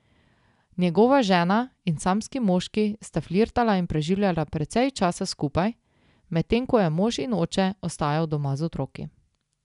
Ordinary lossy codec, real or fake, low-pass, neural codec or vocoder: none; real; 10.8 kHz; none